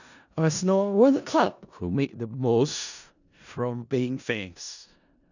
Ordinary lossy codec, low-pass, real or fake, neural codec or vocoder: none; 7.2 kHz; fake; codec, 16 kHz in and 24 kHz out, 0.4 kbps, LongCat-Audio-Codec, four codebook decoder